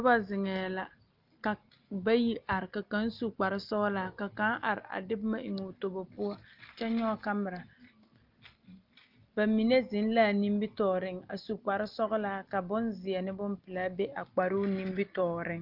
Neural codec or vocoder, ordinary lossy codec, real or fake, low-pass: none; Opus, 24 kbps; real; 5.4 kHz